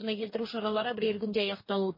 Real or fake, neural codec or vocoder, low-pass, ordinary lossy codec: fake; codec, 44.1 kHz, 2.6 kbps, DAC; 5.4 kHz; MP3, 24 kbps